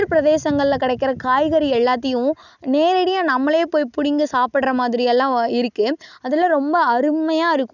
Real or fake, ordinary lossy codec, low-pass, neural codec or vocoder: real; none; 7.2 kHz; none